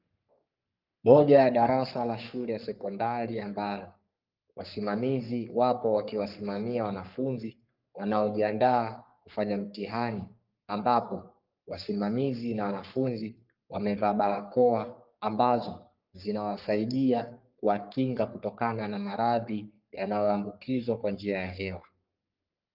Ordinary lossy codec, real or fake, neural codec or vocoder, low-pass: Opus, 24 kbps; fake; codec, 44.1 kHz, 3.4 kbps, Pupu-Codec; 5.4 kHz